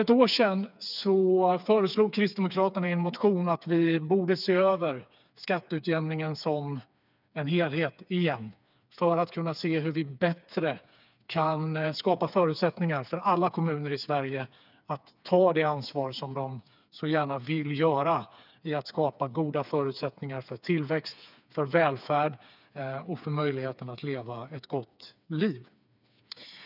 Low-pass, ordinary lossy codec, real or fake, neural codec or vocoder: 5.4 kHz; none; fake; codec, 16 kHz, 4 kbps, FreqCodec, smaller model